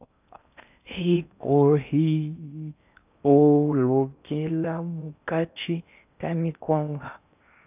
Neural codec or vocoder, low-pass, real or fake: codec, 16 kHz in and 24 kHz out, 0.6 kbps, FocalCodec, streaming, 4096 codes; 3.6 kHz; fake